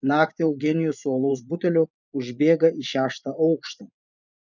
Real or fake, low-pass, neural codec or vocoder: fake; 7.2 kHz; vocoder, 24 kHz, 100 mel bands, Vocos